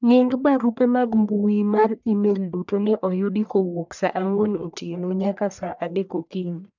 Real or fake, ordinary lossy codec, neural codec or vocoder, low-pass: fake; none; codec, 44.1 kHz, 1.7 kbps, Pupu-Codec; 7.2 kHz